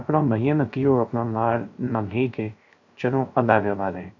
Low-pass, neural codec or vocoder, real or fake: 7.2 kHz; codec, 16 kHz, 0.3 kbps, FocalCodec; fake